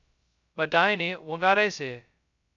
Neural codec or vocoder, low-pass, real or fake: codec, 16 kHz, 0.2 kbps, FocalCodec; 7.2 kHz; fake